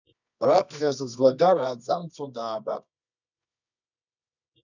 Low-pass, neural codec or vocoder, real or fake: 7.2 kHz; codec, 24 kHz, 0.9 kbps, WavTokenizer, medium music audio release; fake